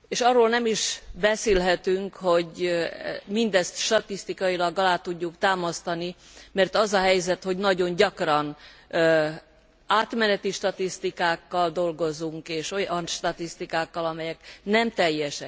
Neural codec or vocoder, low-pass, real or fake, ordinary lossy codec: none; none; real; none